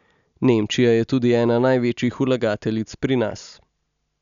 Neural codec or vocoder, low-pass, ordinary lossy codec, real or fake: none; 7.2 kHz; none; real